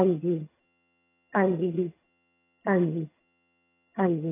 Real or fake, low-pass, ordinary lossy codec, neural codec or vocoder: fake; 3.6 kHz; AAC, 16 kbps; vocoder, 22.05 kHz, 80 mel bands, HiFi-GAN